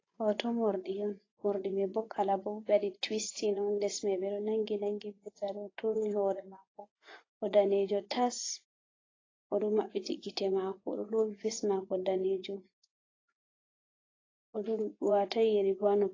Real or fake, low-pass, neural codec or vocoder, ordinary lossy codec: fake; 7.2 kHz; vocoder, 24 kHz, 100 mel bands, Vocos; AAC, 32 kbps